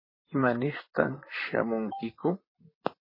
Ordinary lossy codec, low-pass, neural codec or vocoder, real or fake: MP3, 24 kbps; 5.4 kHz; vocoder, 44.1 kHz, 128 mel bands, Pupu-Vocoder; fake